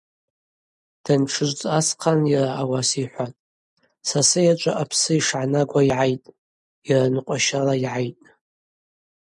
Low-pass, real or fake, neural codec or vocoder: 10.8 kHz; real; none